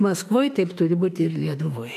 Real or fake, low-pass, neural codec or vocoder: fake; 14.4 kHz; autoencoder, 48 kHz, 32 numbers a frame, DAC-VAE, trained on Japanese speech